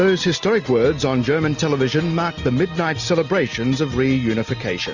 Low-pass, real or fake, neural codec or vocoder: 7.2 kHz; real; none